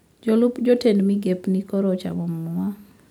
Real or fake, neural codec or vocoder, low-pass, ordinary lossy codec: real; none; 19.8 kHz; none